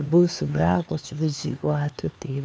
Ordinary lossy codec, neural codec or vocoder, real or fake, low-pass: none; codec, 16 kHz, 4 kbps, X-Codec, HuBERT features, trained on LibriSpeech; fake; none